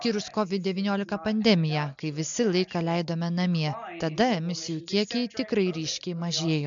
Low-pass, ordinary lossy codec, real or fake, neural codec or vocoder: 7.2 kHz; MP3, 64 kbps; real; none